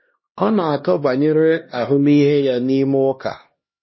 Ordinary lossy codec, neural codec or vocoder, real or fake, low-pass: MP3, 24 kbps; codec, 16 kHz, 1 kbps, X-Codec, HuBERT features, trained on LibriSpeech; fake; 7.2 kHz